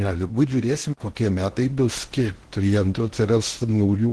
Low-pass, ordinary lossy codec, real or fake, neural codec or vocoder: 10.8 kHz; Opus, 16 kbps; fake; codec, 16 kHz in and 24 kHz out, 0.6 kbps, FocalCodec, streaming, 4096 codes